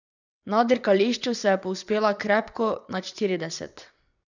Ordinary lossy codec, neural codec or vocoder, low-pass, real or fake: none; vocoder, 22.05 kHz, 80 mel bands, WaveNeXt; 7.2 kHz; fake